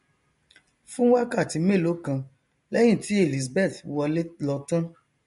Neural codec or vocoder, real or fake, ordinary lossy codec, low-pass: none; real; MP3, 48 kbps; 14.4 kHz